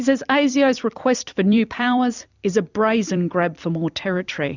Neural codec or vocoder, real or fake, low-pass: vocoder, 44.1 kHz, 128 mel bands every 256 samples, BigVGAN v2; fake; 7.2 kHz